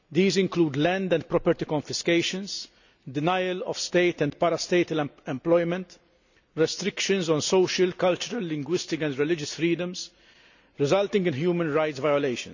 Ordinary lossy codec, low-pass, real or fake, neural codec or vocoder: none; 7.2 kHz; real; none